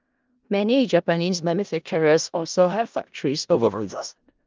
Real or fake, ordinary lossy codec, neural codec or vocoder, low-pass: fake; Opus, 24 kbps; codec, 16 kHz in and 24 kHz out, 0.4 kbps, LongCat-Audio-Codec, four codebook decoder; 7.2 kHz